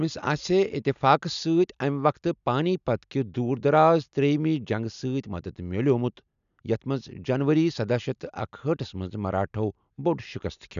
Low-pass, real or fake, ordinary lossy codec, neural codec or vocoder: 7.2 kHz; real; none; none